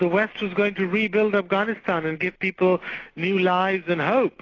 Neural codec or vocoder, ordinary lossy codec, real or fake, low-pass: none; AAC, 32 kbps; real; 7.2 kHz